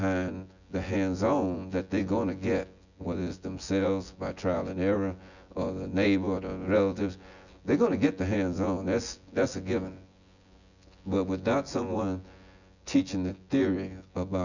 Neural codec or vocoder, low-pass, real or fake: vocoder, 24 kHz, 100 mel bands, Vocos; 7.2 kHz; fake